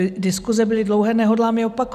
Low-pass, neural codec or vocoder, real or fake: 14.4 kHz; none; real